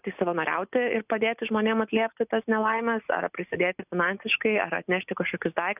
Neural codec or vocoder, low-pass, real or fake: none; 3.6 kHz; real